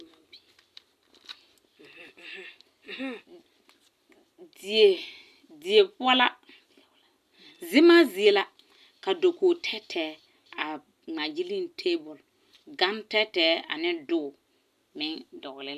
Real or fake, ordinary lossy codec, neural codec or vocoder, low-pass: real; MP3, 96 kbps; none; 14.4 kHz